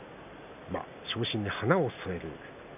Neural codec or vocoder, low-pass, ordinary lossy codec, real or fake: none; 3.6 kHz; none; real